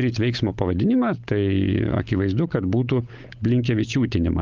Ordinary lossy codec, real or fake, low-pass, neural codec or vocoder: Opus, 32 kbps; fake; 7.2 kHz; codec, 16 kHz, 16 kbps, FunCodec, trained on LibriTTS, 50 frames a second